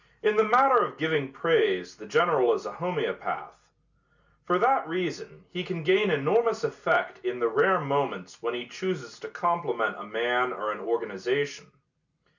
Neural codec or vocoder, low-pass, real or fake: none; 7.2 kHz; real